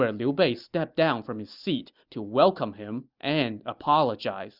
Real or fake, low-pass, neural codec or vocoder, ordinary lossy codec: real; 5.4 kHz; none; Opus, 64 kbps